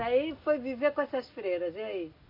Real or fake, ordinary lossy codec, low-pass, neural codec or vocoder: real; MP3, 32 kbps; 5.4 kHz; none